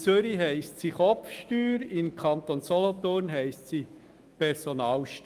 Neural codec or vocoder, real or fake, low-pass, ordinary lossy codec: autoencoder, 48 kHz, 128 numbers a frame, DAC-VAE, trained on Japanese speech; fake; 14.4 kHz; Opus, 32 kbps